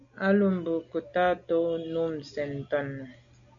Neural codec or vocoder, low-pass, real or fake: none; 7.2 kHz; real